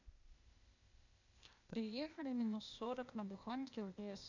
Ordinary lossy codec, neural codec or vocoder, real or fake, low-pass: none; codec, 16 kHz, 0.8 kbps, ZipCodec; fake; 7.2 kHz